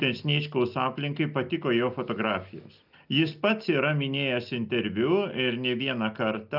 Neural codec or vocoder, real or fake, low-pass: none; real; 5.4 kHz